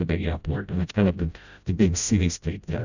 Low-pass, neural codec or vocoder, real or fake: 7.2 kHz; codec, 16 kHz, 0.5 kbps, FreqCodec, smaller model; fake